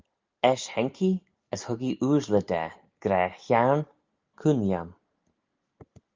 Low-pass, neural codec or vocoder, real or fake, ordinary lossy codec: 7.2 kHz; none; real; Opus, 24 kbps